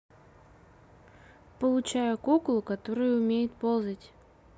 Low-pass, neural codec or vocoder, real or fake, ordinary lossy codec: none; none; real; none